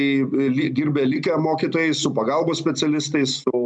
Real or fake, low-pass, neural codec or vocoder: real; 9.9 kHz; none